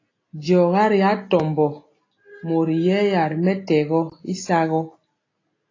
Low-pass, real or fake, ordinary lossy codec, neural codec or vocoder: 7.2 kHz; real; AAC, 32 kbps; none